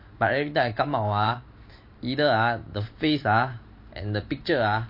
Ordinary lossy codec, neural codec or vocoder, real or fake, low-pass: MP3, 32 kbps; vocoder, 44.1 kHz, 128 mel bands every 512 samples, BigVGAN v2; fake; 5.4 kHz